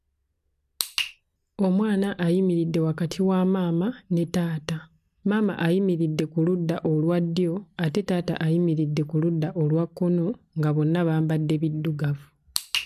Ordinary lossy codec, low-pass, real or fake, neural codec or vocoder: none; 14.4 kHz; real; none